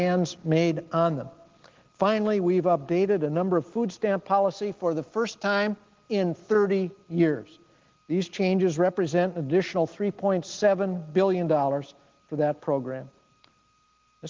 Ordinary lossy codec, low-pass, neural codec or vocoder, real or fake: Opus, 16 kbps; 7.2 kHz; none; real